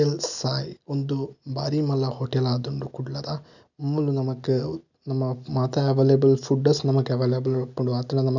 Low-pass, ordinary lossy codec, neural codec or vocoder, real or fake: 7.2 kHz; none; none; real